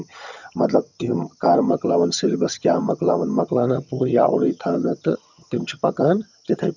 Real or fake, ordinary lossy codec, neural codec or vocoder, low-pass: fake; none; vocoder, 22.05 kHz, 80 mel bands, HiFi-GAN; 7.2 kHz